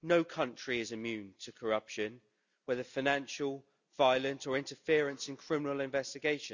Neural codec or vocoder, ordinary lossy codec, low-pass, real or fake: none; none; 7.2 kHz; real